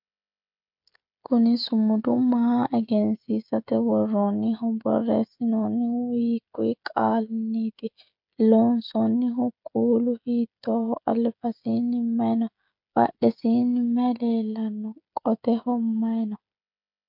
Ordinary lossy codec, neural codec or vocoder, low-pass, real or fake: MP3, 48 kbps; codec, 16 kHz, 16 kbps, FreqCodec, smaller model; 5.4 kHz; fake